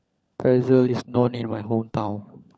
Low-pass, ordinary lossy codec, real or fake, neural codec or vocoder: none; none; fake; codec, 16 kHz, 16 kbps, FunCodec, trained on LibriTTS, 50 frames a second